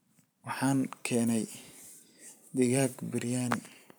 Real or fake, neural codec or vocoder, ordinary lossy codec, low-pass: fake; vocoder, 44.1 kHz, 128 mel bands every 512 samples, BigVGAN v2; none; none